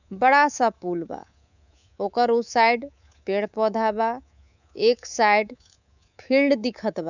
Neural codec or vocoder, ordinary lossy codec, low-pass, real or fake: codec, 24 kHz, 3.1 kbps, DualCodec; none; 7.2 kHz; fake